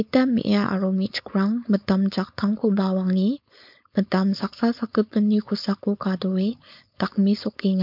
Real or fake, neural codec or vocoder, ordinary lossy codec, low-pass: fake; codec, 16 kHz, 4.8 kbps, FACodec; MP3, 48 kbps; 5.4 kHz